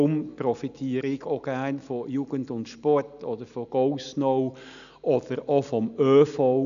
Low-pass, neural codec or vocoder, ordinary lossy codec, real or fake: 7.2 kHz; none; none; real